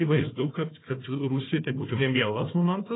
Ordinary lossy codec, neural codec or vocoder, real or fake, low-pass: AAC, 16 kbps; codec, 16 kHz, 1 kbps, FunCodec, trained on Chinese and English, 50 frames a second; fake; 7.2 kHz